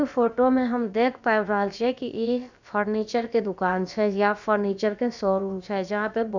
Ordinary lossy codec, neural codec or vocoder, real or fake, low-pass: none; codec, 16 kHz, about 1 kbps, DyCAST, with the encoder's durations; fake; 7.2 kHz